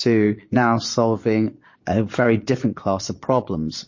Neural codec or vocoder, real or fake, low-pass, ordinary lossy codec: vocoder, 22.05 kHz, 80 mel bands, Vocos; fake; 7.2 kHz; MP3, 32 kbps